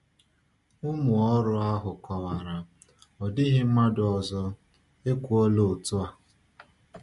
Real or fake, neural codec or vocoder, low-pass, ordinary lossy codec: real; none; 14.4 kHz; MP3, 48 kbps